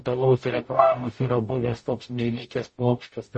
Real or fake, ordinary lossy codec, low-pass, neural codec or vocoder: fake; MP3, 32 kbps; 10.8 kHz; codec, 44.1 kHz, 0.9 kbps, DAC